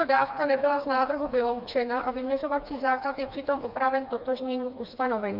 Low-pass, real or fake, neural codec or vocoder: 5.4 kHz; fake; codec, 16 kHz, 2 kbps, FreqCodec, smaller model